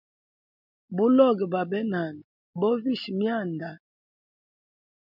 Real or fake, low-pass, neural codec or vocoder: real; 5.4 kHz; none